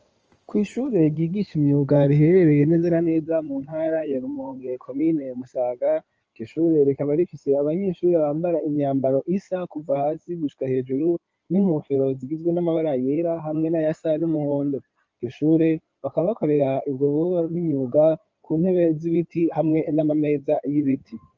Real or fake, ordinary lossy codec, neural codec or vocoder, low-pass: fake; Opus, 24 kbps; codec, 16 kHz in and 24 kHz out, 2.2 kbps, FireRedTTS-2 codec; 7.2 kHz